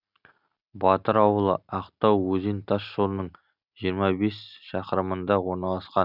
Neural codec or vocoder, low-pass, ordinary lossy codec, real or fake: none; 5.4 kHz; none; real